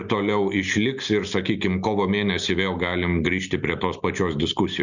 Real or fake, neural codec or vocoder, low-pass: real; none; 7.2 kHz